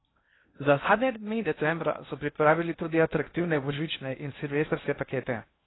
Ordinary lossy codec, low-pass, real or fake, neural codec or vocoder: AAC, 16 kbps; 7.2 kHz; fake; codec, 16 kHz in and 24 kHz out, 0.6 kbps, FocalCodec, streaming, 2048 codes